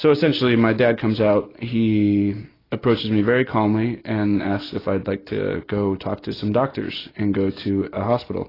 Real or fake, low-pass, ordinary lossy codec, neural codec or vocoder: real; 5.4 kHz; AAC, 24 kbps; none